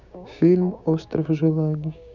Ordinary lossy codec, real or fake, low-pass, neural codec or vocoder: none; fake; 7.2 kHz; codec, 16 kHz, 6 kbps, DAC